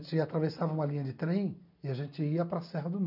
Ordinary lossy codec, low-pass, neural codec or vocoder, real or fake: none; 5.4 kHz; none; real